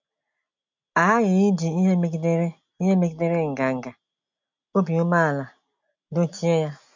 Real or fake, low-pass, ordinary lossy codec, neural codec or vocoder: real; 7.2 kHz; MP3, 48 kbps; none